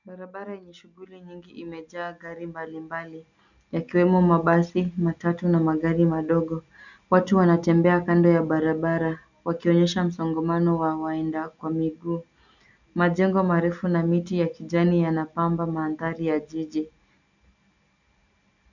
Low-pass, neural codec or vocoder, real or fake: 7.2 kHz; none; real